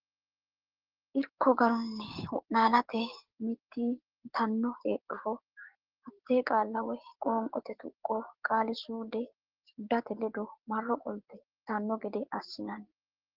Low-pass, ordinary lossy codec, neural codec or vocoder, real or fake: 5.4 kHz; Opus, 16 kbps; codec, 16 kHz, 6 kbps, DAC; fake